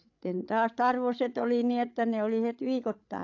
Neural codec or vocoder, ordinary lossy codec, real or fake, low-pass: codec, 16 kHz, 16 kbps, FreqCodec, larger model; AAC, 48 kbps; fake; 7.2 kHz